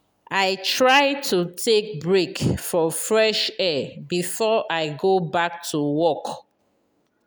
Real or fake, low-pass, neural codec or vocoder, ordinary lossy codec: real; none; none; none